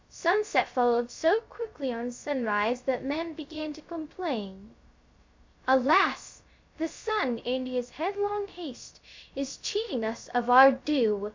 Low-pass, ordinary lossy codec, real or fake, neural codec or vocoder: 7.2 kHz; MP3, 64 kbps; fake; codec, 16 kHz, 0.3 kbps, FocalCodec